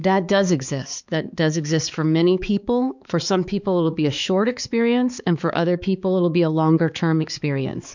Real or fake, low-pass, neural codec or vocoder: fake; 7.2 kHz; codec, 16 kHz, 4 kbps, X-Codec, HuBERT features, trained on balanced general audio